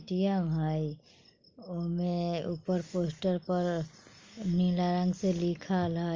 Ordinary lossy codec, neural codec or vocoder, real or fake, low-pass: Opus, 32 kbps; none; real; 7.2 kHz